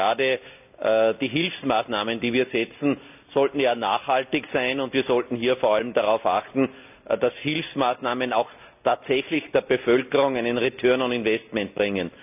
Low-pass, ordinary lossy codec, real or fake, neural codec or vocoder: 3.6 kHz; none; real; none